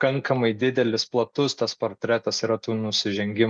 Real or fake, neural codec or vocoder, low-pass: real; none; 9.9 kHz